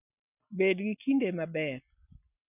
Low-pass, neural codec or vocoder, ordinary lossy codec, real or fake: 3.6 kHz; none; none; real